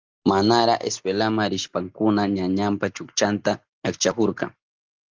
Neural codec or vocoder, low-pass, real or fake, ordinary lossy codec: none; 7.2 kHz; real; Opus, 24 kbps